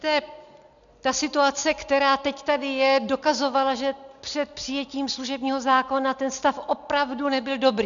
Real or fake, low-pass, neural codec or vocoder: real; 7.2 kHz; none